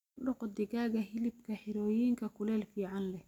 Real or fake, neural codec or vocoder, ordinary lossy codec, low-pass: real; none; none; 19.8 kHz